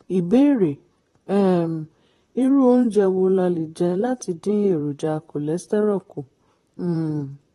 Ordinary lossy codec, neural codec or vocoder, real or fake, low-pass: AAC, 32 kbps; vocoder, 44.1 kHz, 128 mel bands, Pupu-Vocoder; fake; 19.8 kHz